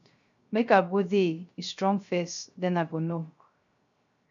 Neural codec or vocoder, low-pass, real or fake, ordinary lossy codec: codec, 16 kHz, 0.3 kbps, FocalCodec; 7.2 kHz; fake; MP3, 64 kbps